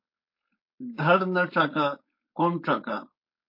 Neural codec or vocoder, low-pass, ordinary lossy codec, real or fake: codec, 16 kHz, 4.8 kbps, FACodec; 5.4 kHz; MP3, 32 kbps; fake